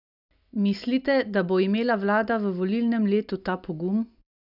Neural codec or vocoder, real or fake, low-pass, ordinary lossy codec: none; real; 5.4 kHz; none